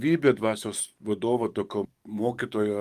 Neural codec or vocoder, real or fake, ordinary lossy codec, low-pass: codec, 44.1 kHz, 7.8 kbps, DAC; fake; Opus, 32 kbps; 14.4 kHz